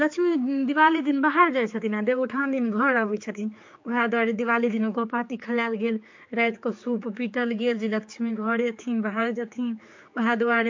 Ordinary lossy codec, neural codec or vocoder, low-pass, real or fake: MP3, 48 kbps; codec, 16 kHz, 4 kbps, X-Codec, HuBERT features, trained on general audio; 7.2 kHz; fake